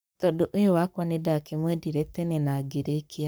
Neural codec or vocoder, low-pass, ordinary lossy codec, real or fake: codec, 44.1 kHz, 7.8 kbps, Pupu-Codec; none; none; fake